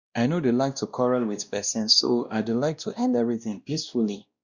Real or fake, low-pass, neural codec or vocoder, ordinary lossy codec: fake; 7.2 kHz; codec, 16 kHz, 1 kbps, X-Codec, WavLM features, trained on Multilingual LibriSpeech; Opus, 64 kbps